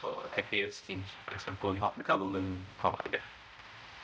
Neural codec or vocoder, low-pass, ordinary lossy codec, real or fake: codec, 16 kHz, 0.5 kbps, X-Codec, HuBERT features, trained on balanced general audio; none; none; fake